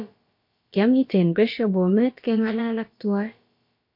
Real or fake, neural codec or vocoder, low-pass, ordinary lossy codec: fake; codec, 16 kHz, about 1 kbps, DyCAST, with the encoder's durations; 5.4 kHz; MP3, 32 kbps